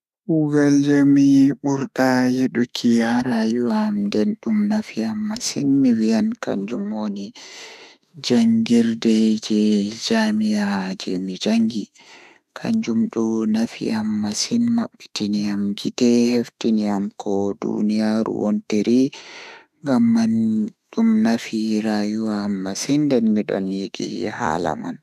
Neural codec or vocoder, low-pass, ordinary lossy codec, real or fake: autoencoder, 48 kHz, 32 numbers a frame, DAC-VAE, trained on Japanese speech; 14.4 kHz; none; fake